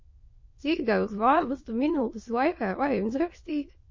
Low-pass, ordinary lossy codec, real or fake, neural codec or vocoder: 7.2 kHz; MP3, 32 kbps; fake; autoencoder, 22.05 kHz, a latent of 192 numbers a frame, VITS, trained on many speakers